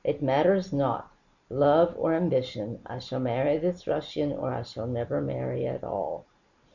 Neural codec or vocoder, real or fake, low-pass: none; real; 7.2 kHz